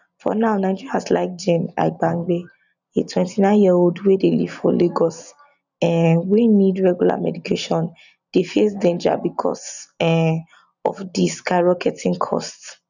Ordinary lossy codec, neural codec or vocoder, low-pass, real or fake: none; none; 7.2 kHz; real